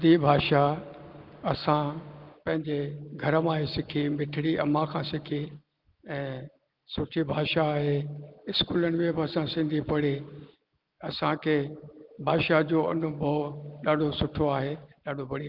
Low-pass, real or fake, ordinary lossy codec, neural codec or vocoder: 5.4 kHz; real; Opus, 32 kbps; none